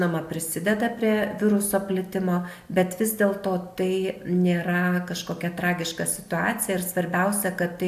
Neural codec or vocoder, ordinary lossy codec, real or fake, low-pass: none; AAC, 96 kbps; real; 14.4 kHz